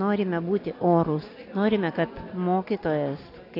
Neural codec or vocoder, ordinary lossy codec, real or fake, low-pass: none; AAC, 32 kbps; real; 5.4 kHz